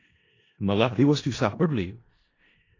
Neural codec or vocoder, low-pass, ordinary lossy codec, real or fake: codec, 16 kHz in and 24 kHz out, 0.4 kbps, LongCat-Audio-Codec, four codebook decoder; 7.2 kHz; AAC, 32 kbps; fake